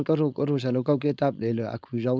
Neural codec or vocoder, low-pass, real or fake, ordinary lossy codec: codec, 16 kHz, 4.8 kbps, FACodec; none; fake; none